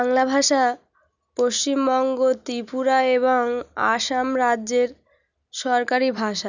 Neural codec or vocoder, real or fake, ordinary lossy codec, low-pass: none; real; none; 7.2 kHz